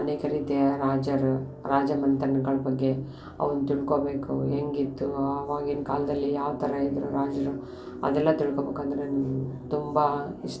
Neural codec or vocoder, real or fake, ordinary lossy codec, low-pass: none; real; none; none